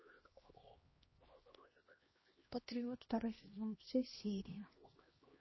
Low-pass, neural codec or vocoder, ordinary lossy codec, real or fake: 7.2 kHz; codec, 16 kHz, 1 kbps, X-Codec, HuBERT features, trained on LibriSpeech; MP3, 24 kbps; fake